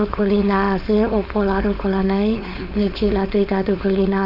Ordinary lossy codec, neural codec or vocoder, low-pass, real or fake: none; codec, 16 kHz, 4.8 kbps, FACodec; 5.4 kHz; fake